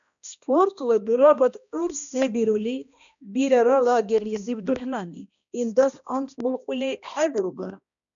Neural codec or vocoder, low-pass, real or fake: codec, 16 kHz, 1 kbps, X-Codec, HuBERT features, trained on balanced general audio; 7.2 kHz; fake